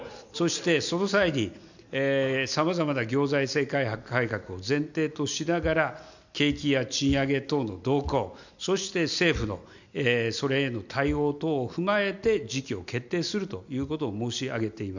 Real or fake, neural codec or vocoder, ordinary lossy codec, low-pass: real; none; none; 7.2 kHz